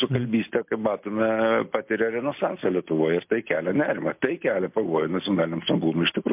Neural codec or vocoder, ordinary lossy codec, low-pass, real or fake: none; MP3, 32 kbps; 3.6 kHz; real